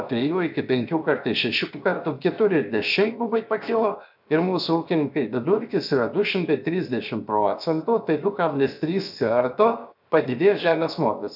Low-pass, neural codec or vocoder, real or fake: 5.4 kHz; codec, 16 kHz, 0.7 kbps, FocalCodec; fake